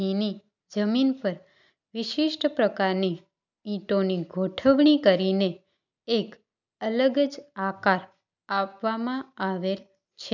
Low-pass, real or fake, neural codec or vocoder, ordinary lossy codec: 7.2 kHz; real; none; none